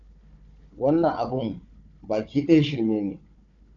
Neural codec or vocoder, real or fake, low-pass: codec, 16 kHz, 4 kbps, FunCodec, trained on Chinese and English, 50 frames a second; fake; 7.2 kHz